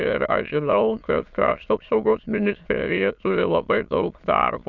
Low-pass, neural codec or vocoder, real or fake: 7.2 kHz; autoencoder, 22.05 kHz, a latent of 192 numbers a frame, VITS, trained on many speakers; fake